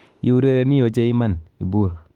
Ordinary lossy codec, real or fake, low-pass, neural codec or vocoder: Opus, 24 kbps; fake; 19.8 kHz; autoencoder, 48 kHz, 32 numbers a frame, DAC-VAE, trained on Japanese speech